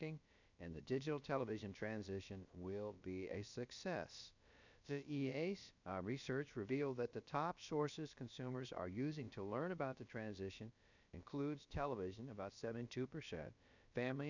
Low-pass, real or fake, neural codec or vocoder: 7.2 kHz; fake; codec, 16 kHz, about 1 kbps, DyCAST, with the encoder's durations